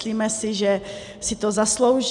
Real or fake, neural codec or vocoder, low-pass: real; none; 10.8 kHz